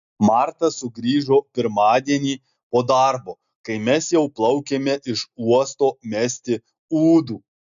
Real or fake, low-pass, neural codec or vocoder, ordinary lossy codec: real; 7.2 kHz; none; AAC, 96 kbps